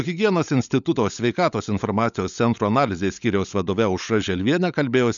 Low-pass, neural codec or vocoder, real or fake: 7.2 kHz; codec, 16 kHz, 16 kbps, FunCodec, trained on LibriTTS, 50 frames a second; fake